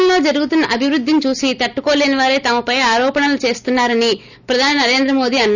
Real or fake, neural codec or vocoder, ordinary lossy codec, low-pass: real; none; none; 7.2 kHz